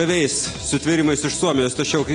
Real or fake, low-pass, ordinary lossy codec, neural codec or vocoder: real; 9.9 kHz; AAC, 32 kbps; none